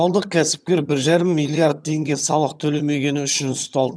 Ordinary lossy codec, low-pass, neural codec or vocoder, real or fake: none; none; vocoder, 22.05 kHz, 80 mel bands, HiFi-GAN; fake